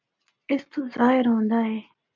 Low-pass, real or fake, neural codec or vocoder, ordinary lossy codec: 7.2 kHz; real; none; MP3, 64 kbps